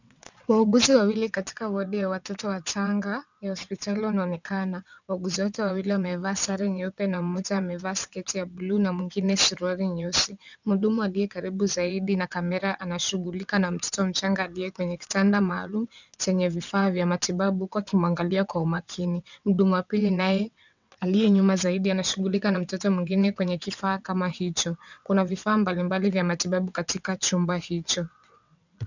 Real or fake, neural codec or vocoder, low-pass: fake; vocoder, 22.05 kHz, 80 mel bands, WaveNeXt; 7.2 kHz